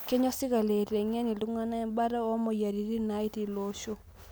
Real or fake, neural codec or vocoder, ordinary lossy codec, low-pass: real; none; none; none